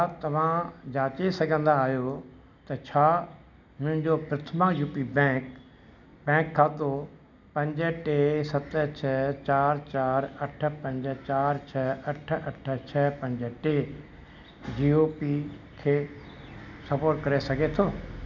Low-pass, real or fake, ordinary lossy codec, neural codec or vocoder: 7.2 kHz; real; none; none